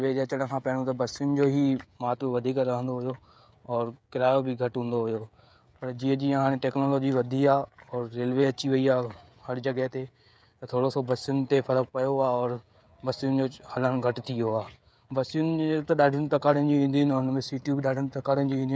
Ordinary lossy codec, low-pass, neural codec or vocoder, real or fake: none; none; codec, 16 kHz, 16 kbps, FreqCodec, smaller model; fake